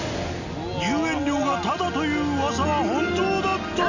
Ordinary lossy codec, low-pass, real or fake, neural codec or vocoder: none; 7.2 kHz; real; none